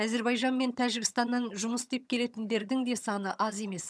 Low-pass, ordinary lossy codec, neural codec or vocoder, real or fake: none; none; vocoder, 22.05 kHz, 80 mel bands, HiFi-GAN; fake